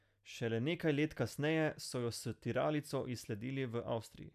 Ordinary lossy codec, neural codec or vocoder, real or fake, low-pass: none; none; real; 14.4 kHz